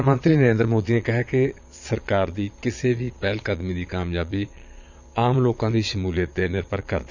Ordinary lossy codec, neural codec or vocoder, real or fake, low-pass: none; vocoder, 22.05 kHz, 80 mel bands, Vocos; fake; 7.2 kHz